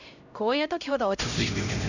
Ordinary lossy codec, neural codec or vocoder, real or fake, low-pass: none; codec, 16 kHz, 0.5 kbps, X-Codec, HuBERT features, trained on LibriSpeech; fake; 7.2 kHz